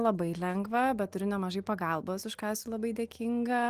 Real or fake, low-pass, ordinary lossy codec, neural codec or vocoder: real; 14.4 kHz; Opus, 24 kbps; none